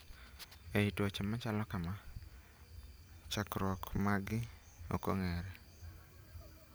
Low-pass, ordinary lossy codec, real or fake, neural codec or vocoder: none; none; real; none